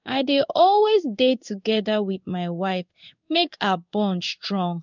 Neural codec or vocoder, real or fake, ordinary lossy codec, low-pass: codec, 16 kHz in and 24 kHz out, 1 kbps, XY-Tokenizer; fake; none; 7.2 kHz